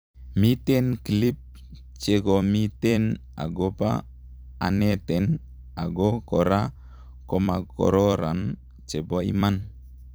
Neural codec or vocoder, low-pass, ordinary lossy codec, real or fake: vocoder, 44.1 kHz, 128 mel bands every 256 samples, BigVGAN v2; none; none; fake